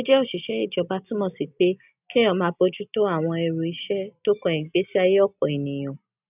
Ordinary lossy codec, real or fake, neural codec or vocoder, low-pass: none; real; none; 3.6 kHz